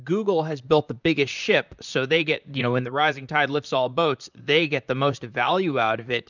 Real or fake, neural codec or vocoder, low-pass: fake; vocoder, 44.1 kHz, 128 mel bands, Pupu-Vocoder; 7.2 kHz